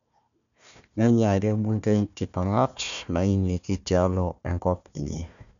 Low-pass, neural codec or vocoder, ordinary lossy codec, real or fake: 7.2 kHz; codec, 16 kHz, 1 kbps, FunCodec, trained on Chinese and English, 50 frames a second; none; fake